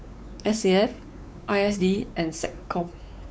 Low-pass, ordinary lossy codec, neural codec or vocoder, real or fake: none; none; codec, 16 kHz, 4 kbps, X-Codec, WavLM features, trained on Multilingual LibriSpeech; fake